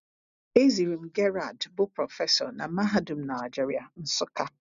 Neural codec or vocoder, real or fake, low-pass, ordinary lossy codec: none; real; 7.2 kHz; MP3, 96 kbps